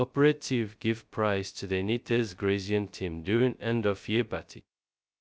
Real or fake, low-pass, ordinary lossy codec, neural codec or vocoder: fake; none; none; codec, 16 kHz, 0.2 kbps, FocalCodec